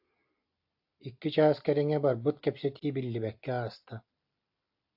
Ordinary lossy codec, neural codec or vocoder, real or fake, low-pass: AAC, 48 kbps; none; real; 5.4 kHz